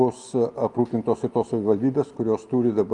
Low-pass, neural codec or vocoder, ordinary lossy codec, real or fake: 10.8 kHz; none; Opus, 32 kbps; real